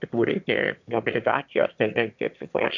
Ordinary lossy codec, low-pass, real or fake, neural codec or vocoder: MP3, 64 kbps; 7.2 kHz; fake; autoencoder, 22.05 kHz, a latent of 192 numbers a frame, VITS, trained on one speaker